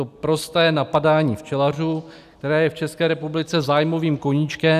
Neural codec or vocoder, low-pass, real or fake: none; 14.4 kHz; real